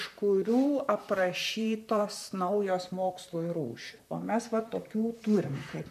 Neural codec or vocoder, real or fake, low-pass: vocoder, 44.1 kHz, 128 mel bands, Pupu-Vocoder; fake; 14.4 kHz